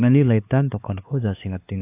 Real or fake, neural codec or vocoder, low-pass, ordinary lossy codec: fake; codec, 16 kHz, 4 kbps, X-Codec, HuBERT features, trained on LibriSpeech; 3.6 kHz; AAC, 24 kbps